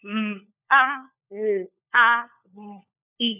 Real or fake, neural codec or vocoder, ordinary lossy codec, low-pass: fake; codec, 16 kHz, 4 kbps, FunCodec, trained on LibriTTS, 50 frames a second; none; 3.6 kHz